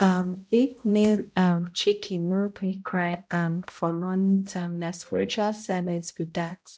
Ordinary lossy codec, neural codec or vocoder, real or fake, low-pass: none; codec, 16 kHz, 0.5 kbps, X-Codec, HuBERT features, trained on balanced general audio; fake; none